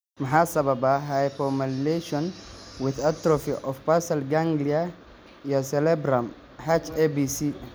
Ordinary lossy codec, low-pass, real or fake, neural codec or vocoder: none; none; real; none